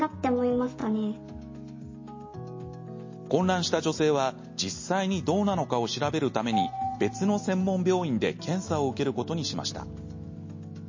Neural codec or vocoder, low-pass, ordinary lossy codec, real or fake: none; 7.2 kHz; MP3, 32 kbps; real